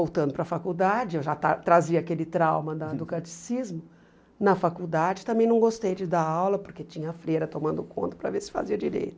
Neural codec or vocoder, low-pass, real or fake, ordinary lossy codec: none; none; real; none